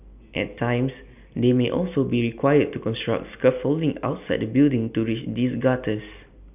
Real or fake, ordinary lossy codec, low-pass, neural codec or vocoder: real; none; 3.6 kHz; none